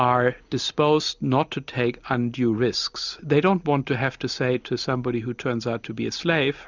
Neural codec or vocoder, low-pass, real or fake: none; 7.2 kHz; real